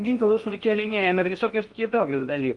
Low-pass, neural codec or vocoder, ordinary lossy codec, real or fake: 10.8 kHz; codec, 16 kHz in and 24 kHz out, 0.8 kbps, FocalCodec, streaming, 65536 codes; Opus, 32 kbps; fake